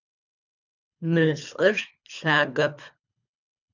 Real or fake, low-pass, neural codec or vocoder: fake; 7.2 kHz; codec, 24 kHz, 3 kbps, HILCodec